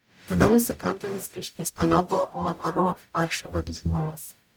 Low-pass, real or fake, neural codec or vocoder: 19.8 kHz; fake; codec, 44.1 kHz, 0.9 kbps, DAC